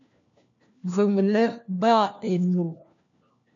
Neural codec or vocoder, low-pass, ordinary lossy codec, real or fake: codec, 16 kHz, 1 kbps, FunCodec, trained on LibriTTS, 50 frames a second; 7.2 kHz; MP3, 96 kbps; fake